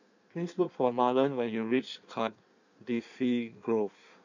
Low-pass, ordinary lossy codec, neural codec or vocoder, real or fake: 7.2 kHz; none; codec, 16 kHz in and 24 kHz out, 1.1 kbps, FireRedTTS-2 codec; fake